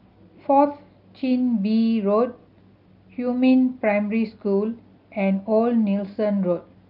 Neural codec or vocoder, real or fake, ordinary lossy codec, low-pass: none; real; Opus, 24 kbps; 5.4 kHz